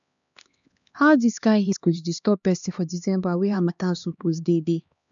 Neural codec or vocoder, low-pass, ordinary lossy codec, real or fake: codec, 16 kHz, 2 kbps, X-Codec, HuBERT features, trained on LibriSpeech; 7.2 kHz; none; fake